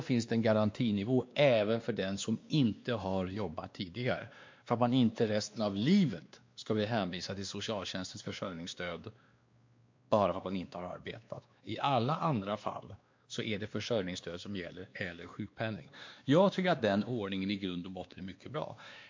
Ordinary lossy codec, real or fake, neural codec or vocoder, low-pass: MP3, 48 kbps; fake; codec, 16 kHz, 2 kbps, X-Codec, WavLM features, trained on Multilingual LibriSpeech; 7.2 kHz